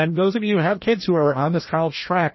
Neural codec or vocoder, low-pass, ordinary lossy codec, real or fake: codec, 16 kHz, 1 kbps, FreqCodec, larger model; 7.2 kHz; MP3, 24 kbps; fake